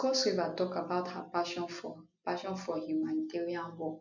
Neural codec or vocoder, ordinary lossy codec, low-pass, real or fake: none; none; 7.2 kHz; real